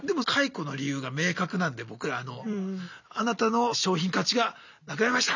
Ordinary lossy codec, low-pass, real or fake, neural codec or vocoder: none; 7.2 kHz; real; none